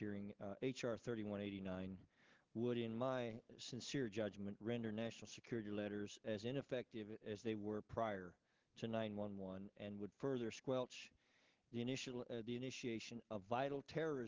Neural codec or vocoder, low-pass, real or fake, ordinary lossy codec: none; 7.2 kHz; real; Opus, 16 kbps